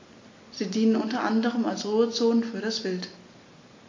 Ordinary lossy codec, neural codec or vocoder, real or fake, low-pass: MP3, 48 kbps; none; real; 7.2 kHz